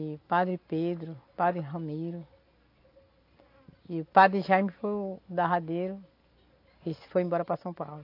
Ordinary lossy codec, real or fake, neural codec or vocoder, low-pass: AAC, 32 kbps; real; none; 5.4 kHz